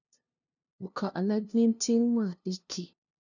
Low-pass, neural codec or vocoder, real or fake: 7.2 kHz; codec, 16 kHz, 0.5 kbps, FunCodec, trained on LibriTTS, 25 frames a second; fake